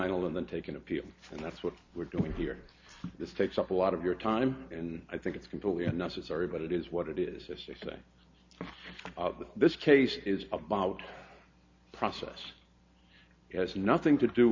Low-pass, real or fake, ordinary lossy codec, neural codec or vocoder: 7.2 kHz; real; MP3, 64 kbps; none